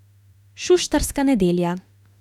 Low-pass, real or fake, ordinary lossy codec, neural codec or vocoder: 19.8 kHz; fake; none; autoencoder, 48 kHz, 32 numbers a frame, DAC-VAE, trained on Japanese speech